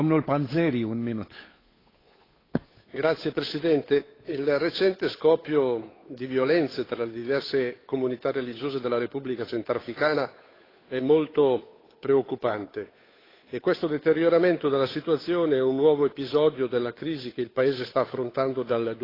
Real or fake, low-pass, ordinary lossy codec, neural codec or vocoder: fake; 5.4 kHz; AAC, 24 kbps; codec, 16 kHz, 8 kbps, FunCodec, trained on Chinese and English, 25 frames a second